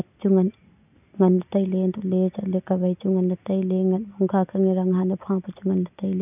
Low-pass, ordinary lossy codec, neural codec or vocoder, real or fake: 3.6 kHz; none; none; real